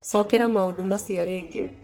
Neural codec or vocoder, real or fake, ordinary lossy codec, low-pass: codec, 44.1 kHz, 1.7 kbps, Pupu-Codec; fake; none; none